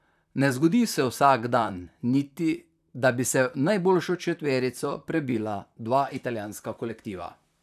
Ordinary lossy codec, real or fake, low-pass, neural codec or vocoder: none; fake; 14.4 kHz; vocoder, 44.1 kHz, 128 mel bands, Pupu-Vocoder